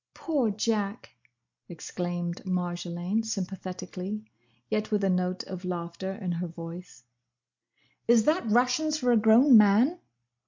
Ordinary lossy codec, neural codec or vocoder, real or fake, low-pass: MP3, 48 kbps; none; real; 7.2 kHz